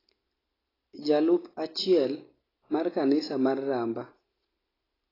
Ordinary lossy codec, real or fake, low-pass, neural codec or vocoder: AAC, 24 kbps; real; 5.4 kHz; none